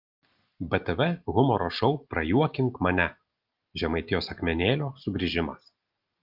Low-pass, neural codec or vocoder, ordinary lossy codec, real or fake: 5.4 kHz; none; Opus, 32 kbps; real